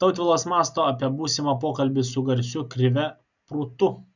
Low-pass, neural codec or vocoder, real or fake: 7.2 kHz; none; real